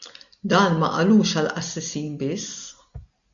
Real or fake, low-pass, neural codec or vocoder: real; 7.2 kHz; none